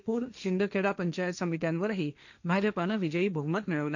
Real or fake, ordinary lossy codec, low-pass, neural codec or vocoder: fake; none; 7.2 kHz; codec, 16 kHz, 1.1 kbps, Voila-Tokenizer